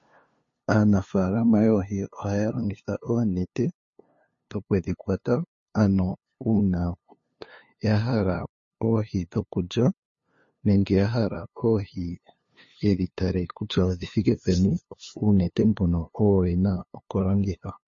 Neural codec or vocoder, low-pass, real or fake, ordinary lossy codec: codec, 16 kHz, 2 kbps, FunCodec, trained on LibriTTS, 25 frames a second; 7.2 kHz; fake; MP3, 32 kbps